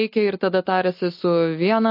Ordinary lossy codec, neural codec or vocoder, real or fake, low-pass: MP3, 32 kbps; none; real; 5.4 kHz